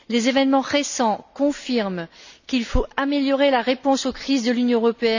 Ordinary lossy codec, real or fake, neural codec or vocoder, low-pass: none; real; none; 7.2 kHz